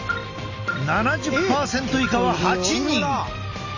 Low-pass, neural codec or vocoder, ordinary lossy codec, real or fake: 7.2 kHz; none; Opus, 64 kbps; real